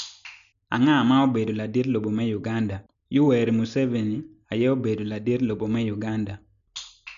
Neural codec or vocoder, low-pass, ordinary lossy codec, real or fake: none; 7.2 kHz; none; real